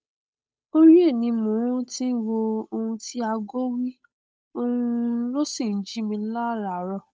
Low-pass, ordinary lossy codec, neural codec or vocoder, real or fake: none; none; codec, 16 kHz, 8 kbps, FunCodec, trained on Chinese and English, 25 frames a second; fake